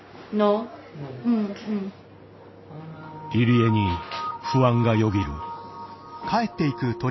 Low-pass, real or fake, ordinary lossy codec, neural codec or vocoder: 7.2 kHz; real; MP3, 24 kbps; none